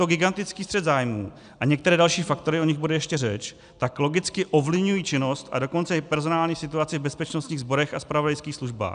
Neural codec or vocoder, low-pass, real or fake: none; 9.9 kHz; real